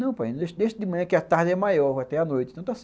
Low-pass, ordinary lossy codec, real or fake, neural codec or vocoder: none; none; real; none